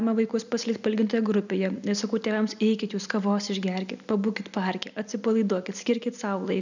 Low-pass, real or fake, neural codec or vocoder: 7.2 kHz; real; none